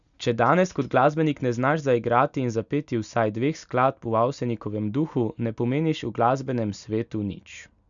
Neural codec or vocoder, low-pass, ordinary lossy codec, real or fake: none; 7.2 kHz; none; real